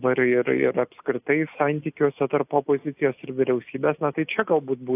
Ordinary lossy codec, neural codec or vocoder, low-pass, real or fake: AAC, 32 kbps; none; 3.6 kHz; real